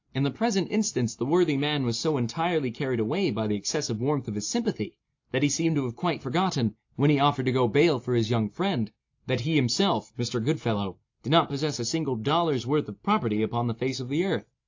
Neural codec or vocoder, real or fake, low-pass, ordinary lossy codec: none; real; 7.2 kHz; AAC, 48 kbps